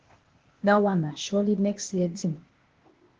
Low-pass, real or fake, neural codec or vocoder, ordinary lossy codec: 7.2 kHz; fake; codec, 16 kHz, 0.8 kbps, ZipCodec; Opus, 16 kbps